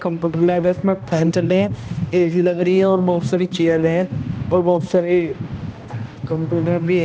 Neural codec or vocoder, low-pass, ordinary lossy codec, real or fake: codec, 16 kHz, 1 kbps, X-Codec, HuBERT features, trained on balanced general audio; none; none; fake